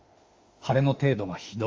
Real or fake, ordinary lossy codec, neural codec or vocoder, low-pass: fake; Opus, 32 kbps; autoencoder, 48 kHz, 32 numbers a frame, DAC-VAE, trained on Japanese speech; 7.2 kHz